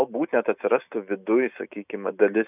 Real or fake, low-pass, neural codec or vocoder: real; 3.6 kHz; none